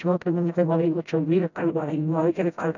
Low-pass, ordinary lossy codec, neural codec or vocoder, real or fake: 7.2 kHz; none; codec, 16 kHz, 0.5 kbps, FreqCodec, smaller model; fake